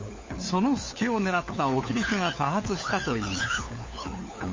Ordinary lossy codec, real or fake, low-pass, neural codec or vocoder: MP3, 32 kbps; fake; 7.2 kHz; codec, 16 kHz, 4 kbps, FunCodec, trained on LibriTTS, 50 frames a second